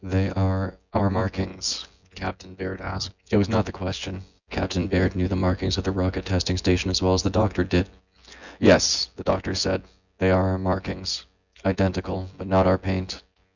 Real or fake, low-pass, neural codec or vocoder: fake; 7.2 kHz; vocoder, 24 kHz, 100 mel bands, Vocos